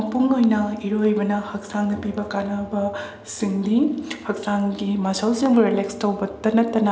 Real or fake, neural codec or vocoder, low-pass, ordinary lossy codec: real; none; none; none